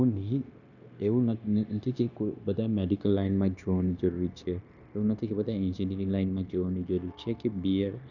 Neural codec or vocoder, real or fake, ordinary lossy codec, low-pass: codec, 16 kHz, 0.9 kbps, LongCat-Audio-Codec; fake; none; 7.2 kHz